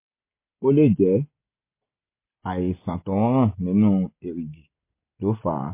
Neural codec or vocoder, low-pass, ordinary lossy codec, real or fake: none; 3.6 kHz; MP3, 24 kbps; real